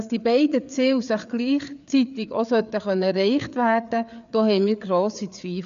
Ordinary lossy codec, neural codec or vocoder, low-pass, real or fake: none; codec, 16 kHz, 4 kbps, FreqCodec, larger model; 7.2 kHz; fake